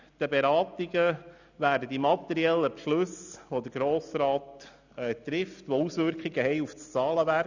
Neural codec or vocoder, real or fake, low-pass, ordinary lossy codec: none; real; 7.2 kHz; none